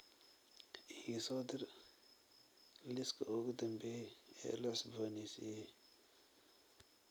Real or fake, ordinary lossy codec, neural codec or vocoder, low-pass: real; none; none; none